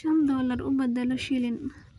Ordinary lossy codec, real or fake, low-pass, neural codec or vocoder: none; real; 10.8 kHz; none